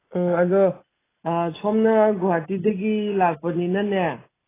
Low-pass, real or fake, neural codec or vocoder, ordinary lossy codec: 3.6 kHz; real; none; AAC, 16 kbps